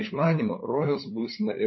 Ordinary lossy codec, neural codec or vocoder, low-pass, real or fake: MP3, 24 kbps; codec, 16 kHz, 8 kbps, FunCodec, trained on LibriTTS, 25 frames a second; 7.2 kHz; fake